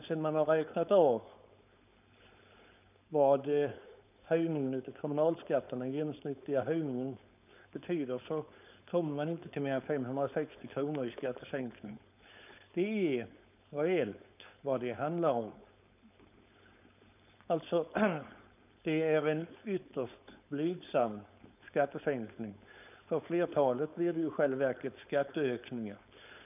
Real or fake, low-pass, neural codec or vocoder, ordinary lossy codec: fake; 3.6 kHz; codec, 16 kHz, 4.8 kbps, FACodec; none